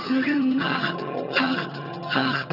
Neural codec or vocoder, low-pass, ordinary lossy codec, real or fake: vocoder, 22.05 kHz, 80 mel bands, HiFi-GAN; 5.4 kHz; none; fake